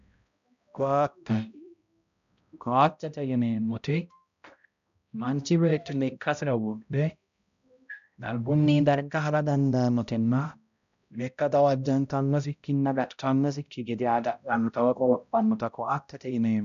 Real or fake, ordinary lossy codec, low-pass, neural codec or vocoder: fake; MP3, 96 kbps; 7.2 kHz; codec, 16 kHz, 0.5 kbps, X-Codec, HuBERT features, trained on balanced general audio